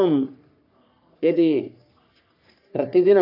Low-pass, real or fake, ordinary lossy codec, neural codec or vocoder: 5.4 kHz; fake; none; codec, 44.1 kHz, 3.4 kbps, Pupu-Codec